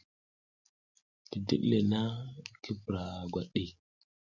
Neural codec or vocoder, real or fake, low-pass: none; real; 7.2 kHz